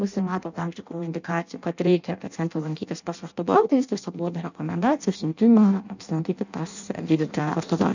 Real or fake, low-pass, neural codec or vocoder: fake; 7.2 kHz; codec, 16 kHz in and 24 kHz out, 0.6 kbps, FireRedTTS-2 codec